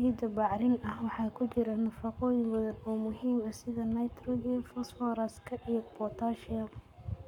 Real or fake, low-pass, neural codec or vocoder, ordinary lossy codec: fake; 19.8 kHz; codec, 44.1 kHz, 7.8 kbps, Pupu-Codec; none